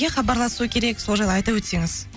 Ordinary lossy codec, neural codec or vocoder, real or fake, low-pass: none; none; real; none